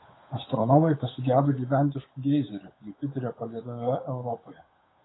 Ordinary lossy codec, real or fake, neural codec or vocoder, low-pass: AAC, 16 kbps; fake; codec, 16 kHz, 8 kbps, FunCodec, trained on Chinese and English, 25 frames a second; 7.2 kHz